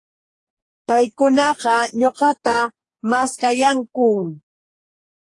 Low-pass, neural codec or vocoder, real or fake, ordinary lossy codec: 10.8 kHz; codec, 44.1 kHz, 2.6 kbps, DAC; fake; AAC, 48 kbps